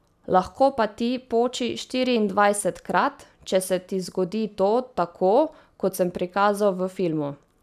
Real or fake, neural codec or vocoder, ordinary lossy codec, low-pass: real; none; none; 14.4 kHz